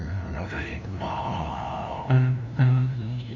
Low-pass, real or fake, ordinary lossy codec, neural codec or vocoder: 7.2 kHz; fake; none; codec, 16 kHz, 0.5 kbps, FunCodec, trained on LibriTTS, 25 frames a second